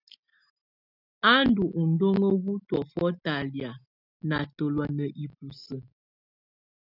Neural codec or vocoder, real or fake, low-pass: none; real; 5.4 kHz